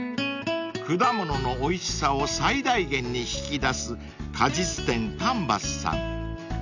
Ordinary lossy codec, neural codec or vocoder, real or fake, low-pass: none; none; real; 7.2 kHz